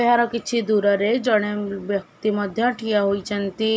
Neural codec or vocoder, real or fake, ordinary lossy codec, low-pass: none; real; none; none